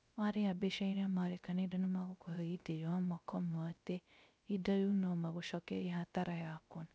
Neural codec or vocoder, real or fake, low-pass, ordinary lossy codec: codec, 16 kHz, 0.3 kbps, FocalCodec; fake; none; none